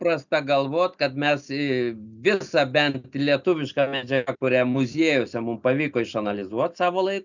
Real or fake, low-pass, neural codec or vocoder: real; 7.2 kHz; none